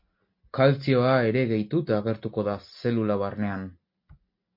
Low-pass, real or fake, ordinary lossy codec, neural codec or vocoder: 5.4 kHz; real; MP3, 32 kbps; none